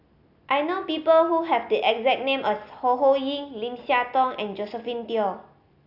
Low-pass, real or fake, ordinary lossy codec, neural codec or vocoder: 5.4 kHz; real; AAC, 48 kbps; none